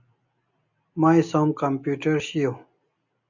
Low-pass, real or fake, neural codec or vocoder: 7.2 kHz; real; none